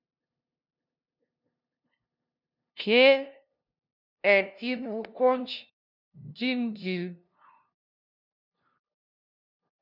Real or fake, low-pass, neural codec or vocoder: fake; 5.4 kHz; codec, 16 kHz, 0.5 kbps, FunCodec, trained on LibriTTS, 25 frames a second